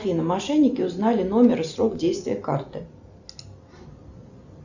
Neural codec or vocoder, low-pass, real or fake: none; 7.2 kHz; real